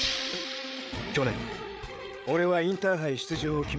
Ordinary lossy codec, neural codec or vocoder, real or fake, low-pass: none; codec, 16 kHz, 8 kbps, FreqCodec, larger model; fake; none